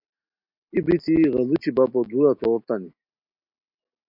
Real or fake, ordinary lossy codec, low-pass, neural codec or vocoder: real; MP3, 48 kbps; 5.4 kHz; none